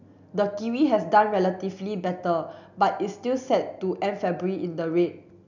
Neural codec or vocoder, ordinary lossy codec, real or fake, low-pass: none; none; real; 7.2 kHz